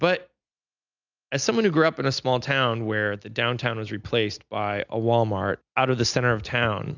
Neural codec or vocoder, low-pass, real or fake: none; 7.2 kHz; real